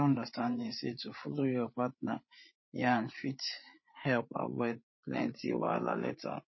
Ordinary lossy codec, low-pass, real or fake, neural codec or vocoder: MP3, 24 kbps; 7.2 kHz; fake; codec, 16 kHz, 8 kbps, FreqCodec, larger model